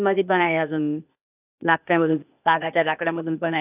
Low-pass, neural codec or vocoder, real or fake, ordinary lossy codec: 3.6 kHz; codec, 16 kHz, 0.8 kbps, ZipCodec; fake; none